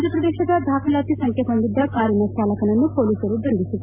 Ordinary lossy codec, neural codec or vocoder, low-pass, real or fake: MP3, 32 kbps; none; 3.6 kHz; real